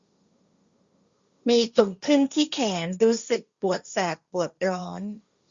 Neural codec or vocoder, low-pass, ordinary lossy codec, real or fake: codec, 16 kHz, 1.1 kbps, Voila-Tokenizer; 7.2 kHz; Opus, 64 kbps; fake